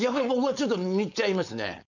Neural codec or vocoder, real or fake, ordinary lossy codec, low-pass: codec, 16 kHz, 4.8 kbps, FACodec; fake; none; 7.2 kHz